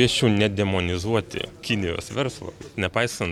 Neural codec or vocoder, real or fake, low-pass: none; real; 19.8 kHz